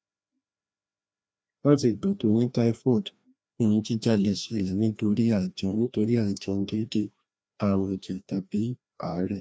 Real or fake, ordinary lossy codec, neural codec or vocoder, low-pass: fake; none; codec, 16 kHz, 1 kbps, FreqCodec, larger model; none